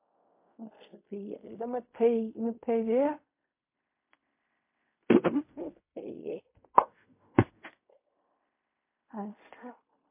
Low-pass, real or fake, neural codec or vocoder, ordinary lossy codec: 3.6 kHz; fake; codec, 16 kHz in and 24 kHz out, 0.4 kbps, LongCat-Audio-Codec, fine tuned four codebook decoder; MP3, 24 kbps